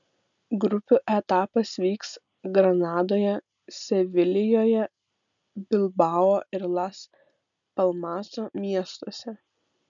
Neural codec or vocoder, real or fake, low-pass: none; real; 7.2 kHz